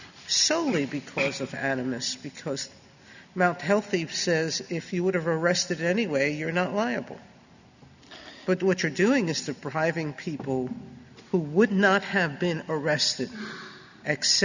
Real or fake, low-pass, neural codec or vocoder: real; 7.2 kHz; none